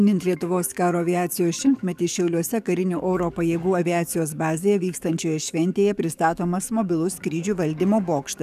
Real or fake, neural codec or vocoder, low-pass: fake; codec, 44.1 kHz, 7.8 kbps, DAC; 14.4 kHz